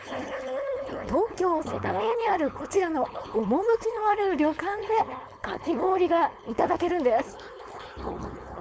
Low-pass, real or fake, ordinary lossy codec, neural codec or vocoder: none; fake; none; codec, 16 kHz, 4.8 kbps, FACodec